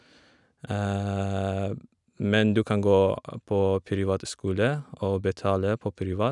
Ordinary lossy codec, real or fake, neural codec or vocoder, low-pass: none; real; none; 10.8 kHz